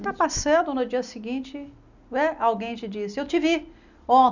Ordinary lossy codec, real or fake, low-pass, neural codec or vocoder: none; real; 7.2 kHz; none